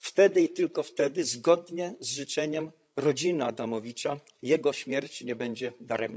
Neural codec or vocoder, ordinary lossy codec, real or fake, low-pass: codec, 16 kHz, 16 kbps, FreqCodec, larger model; none; fake; none